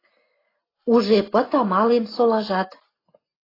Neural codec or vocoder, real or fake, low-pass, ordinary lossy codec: none; real; 5.4 kHz; AAC, 24 kbps